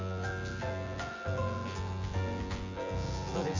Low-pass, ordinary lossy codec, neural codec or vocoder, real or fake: 7.2 kHz; Opus, 32 kbps; vocoder, 24 kHz, 100 mel bands, Vocos; fake